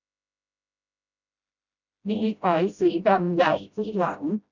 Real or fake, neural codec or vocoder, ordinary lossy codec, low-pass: fake; codec, 16 kHz, 0.5 kbps, FreqCodec, smaller model; none; 7.2 kHz